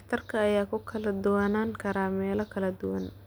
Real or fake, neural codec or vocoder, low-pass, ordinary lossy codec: real; none; none; none